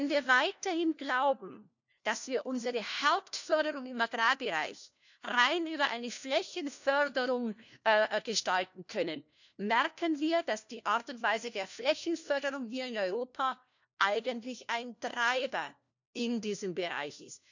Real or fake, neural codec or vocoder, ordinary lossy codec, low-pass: fake; codec, 16 kHz, 1 kbps, FunCodec, trained on LibriTTS, 50 frames a second; none; 7.2 kHz